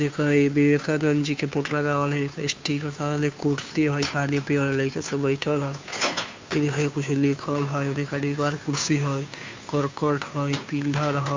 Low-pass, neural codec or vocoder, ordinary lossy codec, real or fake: 7.2 kHz; codec, 16 kHz, 2 kbps, FunCodec, trained on Chinese and English, 25 frames a second; MP3, 64 kbps; fake